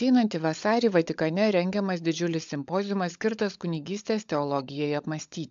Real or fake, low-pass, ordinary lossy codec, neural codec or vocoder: fake; 7.2 kHz; AAC, 64 kbps; codec, 16 kHz, 16 kbps, FunCodec, trained on LibriTTS, 50 frames a second